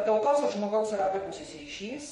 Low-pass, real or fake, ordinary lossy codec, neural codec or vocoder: 19.8 kHz; fake; MP3, 48 kbps; autoencoder, 48 kHz, 32 numbers a frame, DAC-VAE, trained on Japanese speech